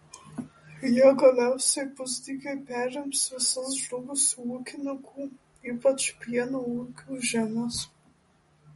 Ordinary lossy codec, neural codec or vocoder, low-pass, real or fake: MP3, 48 kbps; none; 19.8 kHz; real